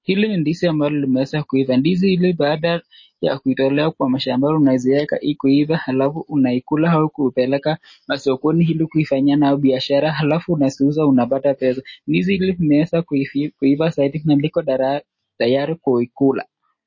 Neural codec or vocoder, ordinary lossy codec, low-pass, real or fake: none; MP3, 32 kbps; 7.2 kHz; real